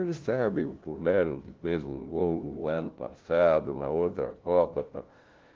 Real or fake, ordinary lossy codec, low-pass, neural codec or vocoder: fake; Opus, 16 kbps; 7.2 kHz; codec, 16 kHz, 0.5 kbps, FunCodec, trained on LibriTTS, 25 frames a second